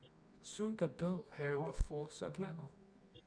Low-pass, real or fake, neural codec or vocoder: 9.9 kHz; fake; codec, 24 kHz, 0.9 kbps, WavTokenizer, medium music audio release